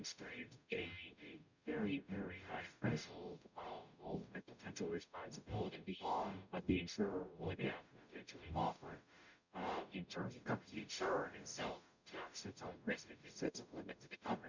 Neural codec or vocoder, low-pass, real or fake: codec, 44.1 kHz, 0.9 kbps, DAC; 7.2 kHz; fake